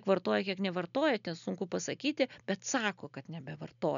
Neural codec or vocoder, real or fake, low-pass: none; real; 7.2 kHz